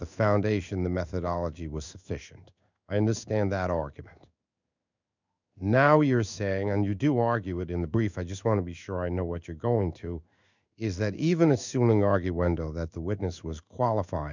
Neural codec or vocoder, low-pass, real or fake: codec, 16 kHz in and 24 kHz out, 1 kbps, XY-Tokenizer; 7.2 kHz; fake